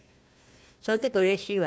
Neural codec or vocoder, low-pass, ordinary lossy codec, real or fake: codec, 16 kHz, 1 kbps, FunCodec, trained on Chinese and English, 50 frames a second; none; none; fake